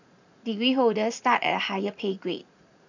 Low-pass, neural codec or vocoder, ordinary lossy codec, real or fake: 7.2 kHz; vocoder, 44.1 kHz, 80 mel bands, Vocos; none; fake